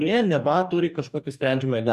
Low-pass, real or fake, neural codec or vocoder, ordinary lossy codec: 14.4 kHz; fake; codec, 44.1 kHz, 2.6 kbps, DAC; MP3, 96 kbps